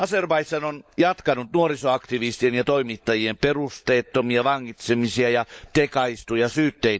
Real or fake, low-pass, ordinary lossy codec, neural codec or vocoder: fake; none; none; codec, 16 kHz, 16 kbps, FunCodec, trained on LibriTTS, 50 frames a second